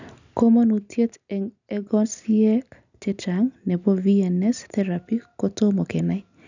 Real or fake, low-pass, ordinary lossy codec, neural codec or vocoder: real; 7.2 kHz; none; none